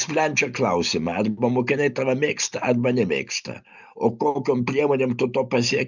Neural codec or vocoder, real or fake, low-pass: none; real; 7.2 kHz